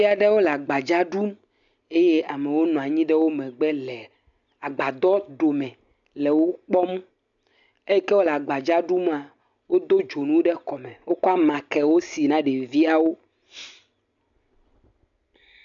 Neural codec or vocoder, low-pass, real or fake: none; 7.2 kHz; real